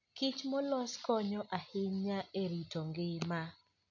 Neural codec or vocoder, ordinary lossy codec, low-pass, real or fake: none; none; 7.2 kHz; real